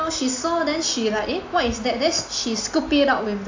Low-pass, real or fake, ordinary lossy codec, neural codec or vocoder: 7.2 kHz; real; AAC, 48 kbps; none